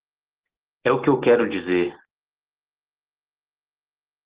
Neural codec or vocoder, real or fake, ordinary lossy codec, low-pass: none; real; Opus, 16 kbps; 3.6 kHz